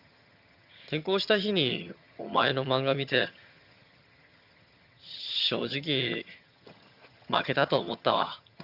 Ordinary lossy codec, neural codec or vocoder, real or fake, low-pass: Opus, 64 kbps; vocoder, 22.05 kHz, 80 mel bands, HiFi-GAN; fake; 5.4 kHz